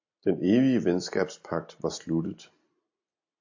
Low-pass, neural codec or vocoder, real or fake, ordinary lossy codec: 7.2 kHz; none; real; AAC, 48 kbps